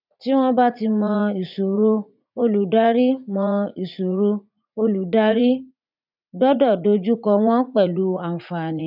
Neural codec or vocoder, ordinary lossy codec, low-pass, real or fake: vocoder, 44.1 kHz, 80 mel bands, Vocos; none; 5.4 kHz; fake